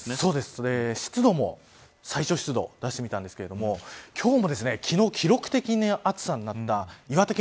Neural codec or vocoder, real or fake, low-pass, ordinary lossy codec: none; real; none; none